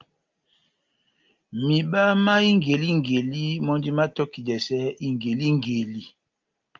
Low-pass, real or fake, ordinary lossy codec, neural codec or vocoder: 7.2 kHz; real; Opus, 32 kbps; none